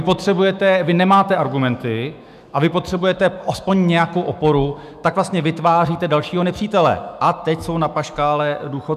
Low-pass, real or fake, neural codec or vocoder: 14.4 kHz; fake; autoencoder, 48 kHz, 128 numbers a frame, DAC-VAE, trained on Japanese speech